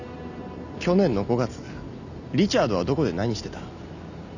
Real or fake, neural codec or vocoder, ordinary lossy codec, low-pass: real; none; none; 7.2 kHz